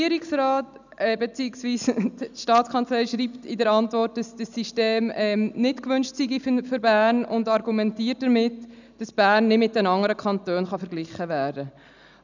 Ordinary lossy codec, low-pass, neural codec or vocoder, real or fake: none; 7.2 kHz; none; real